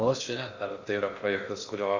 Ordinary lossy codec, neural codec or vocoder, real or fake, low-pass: Opus, 64 kbps; codec, 16 kHz in and 24 kHz out, 0.6 kbps, FocalCodec, streaming, 2048 codes; fake; 7.2 kHz